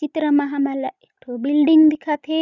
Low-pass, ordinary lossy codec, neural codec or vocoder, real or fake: 7.2 kHz; none; none; real